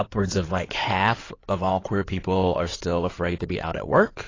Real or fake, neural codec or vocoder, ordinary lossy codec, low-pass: fake; codec, 16 kHz in and 24 kHz out, 2.2 kbps, FireRedTTS-2 codec; AAC, 32 kbps; 7.2 kHz